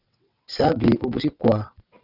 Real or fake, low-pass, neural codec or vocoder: fake; 5.4 kHz; vocoder, 22.05 kHz, 80 mel bands, WaveNeXt